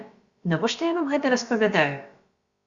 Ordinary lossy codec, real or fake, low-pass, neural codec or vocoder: Opus, 64 kbps; fake; 7.2 kHz; codec, 16 kHz, about 1 kbps, DyCAST, with the encoder's durations